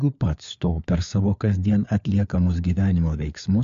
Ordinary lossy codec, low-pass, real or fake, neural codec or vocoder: MP3, 64 kbps; 7.2 kHz; fake; codec, 16 kHz, 4 kbps, FunCodec, trained on LibriTTS, 50 frames a second